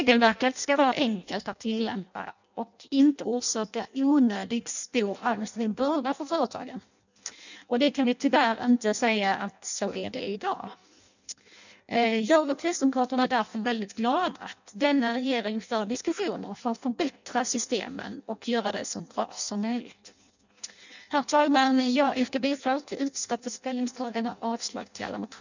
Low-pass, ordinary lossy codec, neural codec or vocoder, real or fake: 7.2 kHz; none; codec, 16 kHz in and 24 kHz out, 0.6 kbps, FireRedTTS-2 codec; fake